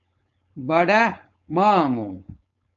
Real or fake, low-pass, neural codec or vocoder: fake; 7.2 kHz; codec, 16 kHz, 4.8 kbps, FACodec